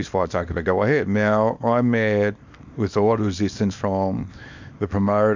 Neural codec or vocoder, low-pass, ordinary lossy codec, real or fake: codec, 24 kHz, 0.9 kbps, WavTokenizer, small release; 7.2 kHz; MP3, 64 kbps; fake